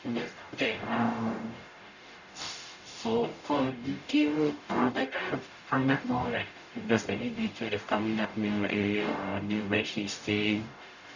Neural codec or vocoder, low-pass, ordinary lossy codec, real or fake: codec, 44.1 kHz, 0.9 kbps, DAC; 7.2 kHz; none; fake